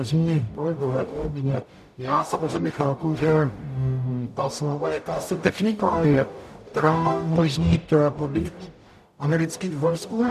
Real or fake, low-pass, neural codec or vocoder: fake; 14.4 kHz; codec, 44.1 kHz, 0.9 kbps, DAC